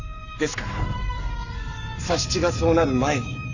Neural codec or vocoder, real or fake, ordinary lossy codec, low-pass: codec, 44.1 kHz, 7.8 kbps, Pupu-Codec; fake; none; 7.2 kHz